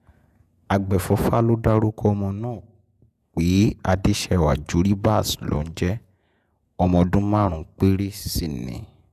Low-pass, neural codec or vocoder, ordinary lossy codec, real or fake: 14.4 kHz; vocoder, 48 kHz, 128 mel bands, Vocos; none; fake